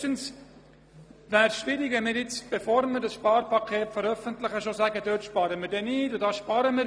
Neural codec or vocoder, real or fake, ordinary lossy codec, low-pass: none; real; none; none